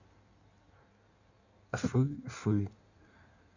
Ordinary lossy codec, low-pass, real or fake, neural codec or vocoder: none; 7.2 kHz; fake; codec, 16 kHz in and 24 kHz out, 1.1 kbps, FireRedTTS-2 codec